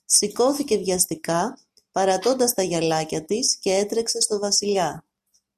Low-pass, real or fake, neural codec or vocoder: 14.4 kHz; real; none